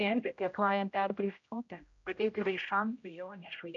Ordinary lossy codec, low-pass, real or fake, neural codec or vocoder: MP3, 48 kbps; 7.2 kHz; fake; codec, 16 kHz, 0.5 kbps, X-Codec, HuBERT features, trained on general audio